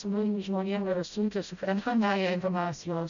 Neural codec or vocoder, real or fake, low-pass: codec, 16 kHz, 0.5 kbps, FreqCodec, smaller model; fake; 7.2 kHz